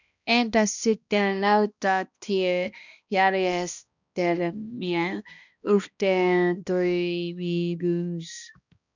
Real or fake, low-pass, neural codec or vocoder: fake; 7.2 kHz; codec, 16 kHz, 1 kbps, X-Codec, HuBERT features, trained on balanced general audio